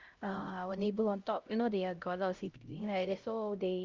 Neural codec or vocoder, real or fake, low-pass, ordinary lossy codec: codec, 16 kHz, 0.5 kbps, X-Codec, HuBERT features, trained on LibriSpeech; fake; 7.2 kHz; Opus, 32 kbps